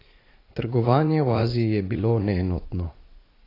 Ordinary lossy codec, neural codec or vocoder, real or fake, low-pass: AAC, 24 kbps; vocoder, 44.1 kHz, 80 mel bands, Vocos; fake; 5.4 kHz